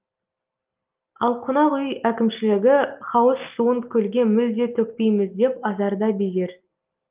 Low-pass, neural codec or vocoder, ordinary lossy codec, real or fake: 3.6 kHz; none; Opus, 24 kbps; real